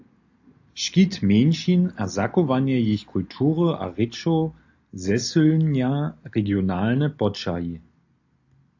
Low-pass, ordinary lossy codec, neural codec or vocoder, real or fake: 7.2 kHz; AAC, 48 kbps; none; real